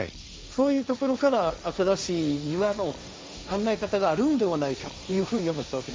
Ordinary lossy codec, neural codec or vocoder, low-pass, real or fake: none; codec, 16 kHz, 1.1 kbps, Voila-Tokenizer; none; fake